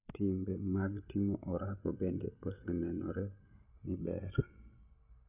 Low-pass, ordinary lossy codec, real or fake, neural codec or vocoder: 3.6 kHz; none; fake; codec, 16 kHz, 16 kbps, FreqCodec, larger model